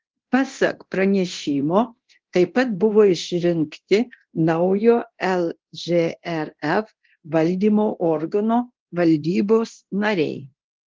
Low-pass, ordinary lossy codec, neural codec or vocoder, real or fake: 7.2 kHz; Opus, 16 kbps; codec, 24 kHz, 0.9 kbps, DualCodec; fake